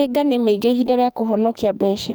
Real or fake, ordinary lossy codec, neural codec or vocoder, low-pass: fake; none; codec, 44.1 kHz, 2.6 kbps, SNAC; none